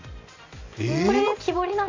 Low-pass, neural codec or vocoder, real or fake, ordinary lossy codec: 7.2 kHz; none; real; MP3, 48 kbps